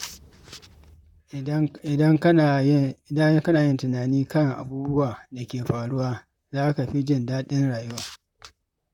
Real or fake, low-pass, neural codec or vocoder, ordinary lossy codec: fake; 19.8 kHz; vocoder, 44.1 kHz, 128 mel bands, Pupu-Vocoder; none